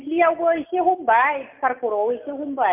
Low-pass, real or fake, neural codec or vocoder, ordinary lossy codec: 3.6 kHz; real; none; MP3, 32 kbps